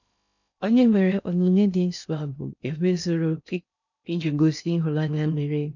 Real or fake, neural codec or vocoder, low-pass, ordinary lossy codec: fake; codec, 16 kHz in and 24 kHz out, 0.6 kbps, FocalCodec, streaming, 2048 codes; 7.2 kHz; none